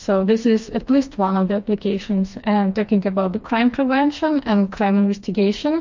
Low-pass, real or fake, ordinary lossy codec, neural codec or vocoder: 7.2 kHz; fake; MP3, 48 kbps; codec, 16 kHz, 2 kbps, FreqCodec, smaller model